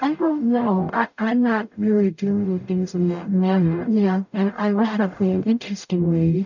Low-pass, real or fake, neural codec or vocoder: 7.2 kHz; fake; codec, 44.1 kHz, 0.9 kbps, DAC